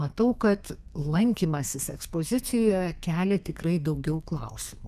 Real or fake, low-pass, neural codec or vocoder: fake; 14.4 kHz; codec, 32 kHz, 1.9 kbps, SNAC